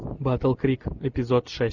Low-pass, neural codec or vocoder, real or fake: 7.2 kHz; none; real